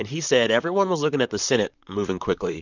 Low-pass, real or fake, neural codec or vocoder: 7.2 kHz; fake; vocoder, 44.1 kHz, 128 mel bands, Pupu-Vocoder